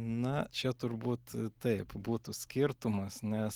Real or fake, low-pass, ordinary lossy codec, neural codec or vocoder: real; 19.8 kHz; Opus, 24 kbps; none